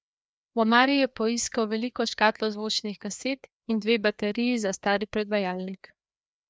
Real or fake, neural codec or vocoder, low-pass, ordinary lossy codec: fake; codec, 16 kHz, 2 kbps, FreqCodec, larger model; none; none